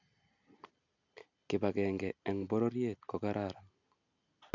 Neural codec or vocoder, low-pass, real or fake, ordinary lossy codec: none; 7.2 kHz; real; none